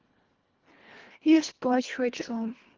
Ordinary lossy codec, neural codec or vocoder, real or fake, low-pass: Opus, 16 kbps; codec, 24 kHz, 1.5 kbps, HILCodec; fake; 7.2 kHz